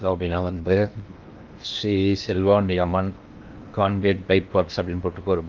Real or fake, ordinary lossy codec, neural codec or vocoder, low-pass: fake; Opus, 32 kbps; codec, 16 kHz in and 24 kHz out, 0.8 kbps, FocalCodec, streaming, 65536 codes; 7.2 kHz